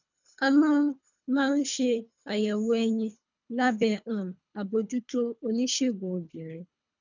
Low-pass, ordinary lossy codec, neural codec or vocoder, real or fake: 7.2 kHz; none; codec, 24 kHz, 3 kbps, HILCodec; fake